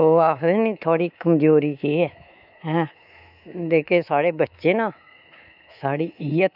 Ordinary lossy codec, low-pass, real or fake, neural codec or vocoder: none; 5.4 kHz; fake; codec, 24 kHz, 3.1 kbps, DualCodec